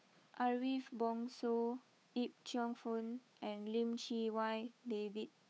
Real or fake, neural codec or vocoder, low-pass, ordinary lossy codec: fake; codec, 16 kHz, 8 kbps, FunCodec, trained on Chinese and English, 25 frames a second; none; none